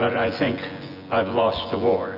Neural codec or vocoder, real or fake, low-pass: vocoder, 24 kHz, 100 mel bands, Vocos; fake; 5.4 kHz